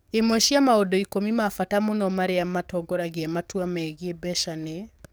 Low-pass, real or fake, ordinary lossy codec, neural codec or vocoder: none; fake; none; codec, 44.1 kHz, 7.8 kbps, DAC